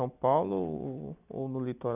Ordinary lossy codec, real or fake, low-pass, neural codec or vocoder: none; real; 3.6 kHz; none